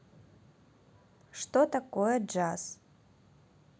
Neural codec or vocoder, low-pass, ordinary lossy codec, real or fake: none; none; none; real